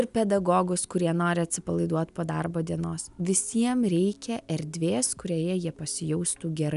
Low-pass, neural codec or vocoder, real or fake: 10.8 kHz; none; real